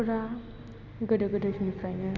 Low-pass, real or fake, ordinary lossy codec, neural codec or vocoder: 7.2 kHz; real; none; none